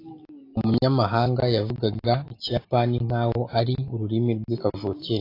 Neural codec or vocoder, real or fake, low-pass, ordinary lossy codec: none; real; 5.4 kHz; AAC, 32 kbps